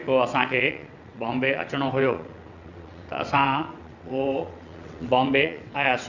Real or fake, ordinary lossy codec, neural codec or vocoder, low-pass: fake; none; vocoder, 22.05 kHz, 80 mel bands, WaveNeXt; 7.2 kHz